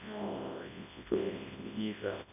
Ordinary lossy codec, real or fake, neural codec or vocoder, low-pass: none; fake; codec, 24 kHz, 0.9 kbps, WavTokenizer, large speech release; 3.6 kHz